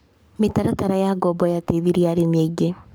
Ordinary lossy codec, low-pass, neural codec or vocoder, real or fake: none; none; codec, 44.1 kHz, 7.8 kbps, Pupu-Codec; fake